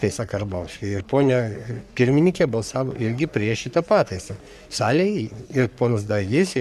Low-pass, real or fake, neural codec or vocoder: 14.4 kHz; fake; codec, 44.1 kHz, 3.4 kbps, Pupu-Codec